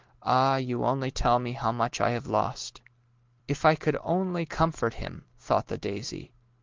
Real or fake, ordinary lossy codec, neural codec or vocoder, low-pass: real; Opus, 16 kbps; none; 7.2 kHz